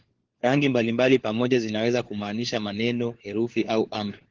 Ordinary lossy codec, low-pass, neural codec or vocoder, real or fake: Opus, 16 kbps; 7.2 kHz; codec, 16 kHz, 4 kbps, FunCodec, trained on LibriTTS, 50 frames a second; fake